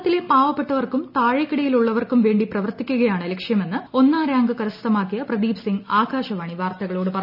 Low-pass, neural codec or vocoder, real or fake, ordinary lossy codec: 5.4 kHz; none; real; none